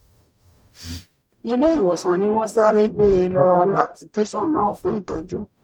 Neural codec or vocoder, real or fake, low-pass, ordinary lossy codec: codec, 44.1 kHz, 0.9 kbps, DAC; fake; 19.8 kHz; none